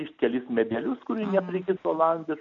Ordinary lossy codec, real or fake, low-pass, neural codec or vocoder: AAC, 64 kbps; real; 7.2 kHz; none